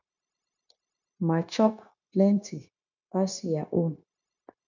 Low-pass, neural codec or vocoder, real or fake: 7.2 kHz; codec, 16 kHz, 0.9 kbps, LongCat-Audio-Codec; fake